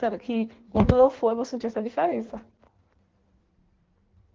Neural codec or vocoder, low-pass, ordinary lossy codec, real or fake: codec, 16 kHz in and 24 kHz out, 1.1 kbps, FireRedTTS-2 codec; 7.2 kHz; Opus, 16 kbps; fake